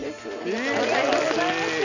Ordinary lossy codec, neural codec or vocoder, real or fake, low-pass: none; none; real; 7.2 kHz